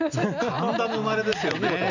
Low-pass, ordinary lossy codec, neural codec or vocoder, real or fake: 7.2 kHz; none; none; real